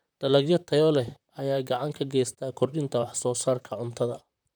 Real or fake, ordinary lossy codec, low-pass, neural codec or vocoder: fake; none; none; vocoder, 44.1 kHz, 128 mel bands, Pupu-Vocoder